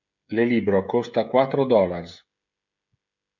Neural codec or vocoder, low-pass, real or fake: codec, 16 kHz, 16 kbps, FreqCodec, smaller model; 7.2 kHz; fake